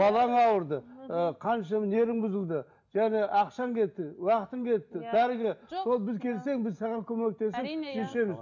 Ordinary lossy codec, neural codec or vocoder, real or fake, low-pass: none; none; real; 7.2 kHz